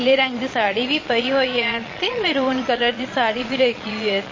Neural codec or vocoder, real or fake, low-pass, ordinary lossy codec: vocoder, 22.05 kHz, 80 mel bands, Vocos; fake; 7.2 kHz; MP3, 32 kbps